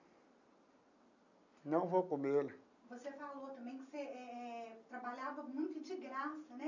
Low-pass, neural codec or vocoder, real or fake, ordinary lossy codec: 7.2 kHz; none; real; none